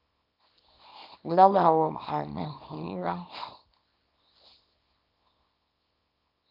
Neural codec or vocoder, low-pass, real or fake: codec, 24 kHz, 0.9 kbps, WavTokenizer, small release; 5.4 kHz; fake